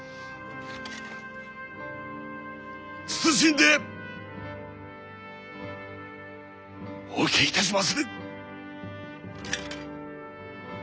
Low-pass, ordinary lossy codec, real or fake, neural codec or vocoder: none; none; real; none